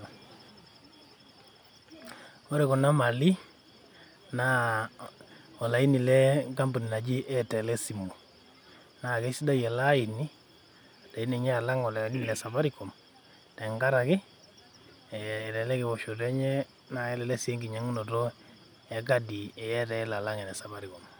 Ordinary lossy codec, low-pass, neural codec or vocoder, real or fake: none; none; none; real